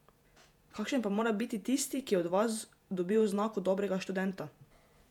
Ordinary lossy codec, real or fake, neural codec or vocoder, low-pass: MP3, 96 kbps; fake; vocoder, 48 kHz, 128 mel bands, Vocos; 19.8 kHz